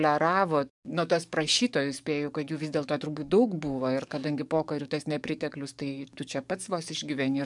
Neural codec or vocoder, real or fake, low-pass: codec, 44.1 kHz, 7.8 kbps, DAC; fake; 10.8 kHz